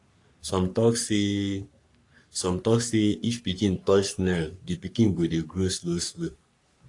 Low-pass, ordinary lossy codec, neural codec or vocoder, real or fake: 10.8 kHz; AAC, 48 kbps; codec, 44.1 kHz, 3.4 kbps, Pupu-Codec; fake